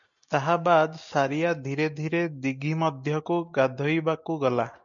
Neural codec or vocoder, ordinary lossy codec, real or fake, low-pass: none; MP3, 96 kbps; real; 7.2 kHz